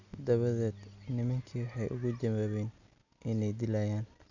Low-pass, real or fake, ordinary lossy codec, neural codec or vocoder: 7.2 kHz; real; none; none